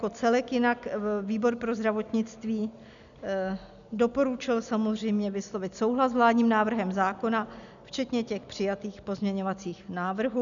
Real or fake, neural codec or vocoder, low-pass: real; none; 7.2 kHz